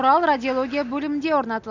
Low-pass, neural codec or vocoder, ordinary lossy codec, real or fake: 7.2 kHz; none; none; real